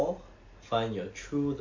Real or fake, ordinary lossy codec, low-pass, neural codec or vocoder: real; MP3, 48 kbps; 7.2 kHz; none